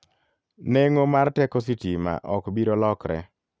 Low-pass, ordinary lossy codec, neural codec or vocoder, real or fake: none; none; none; real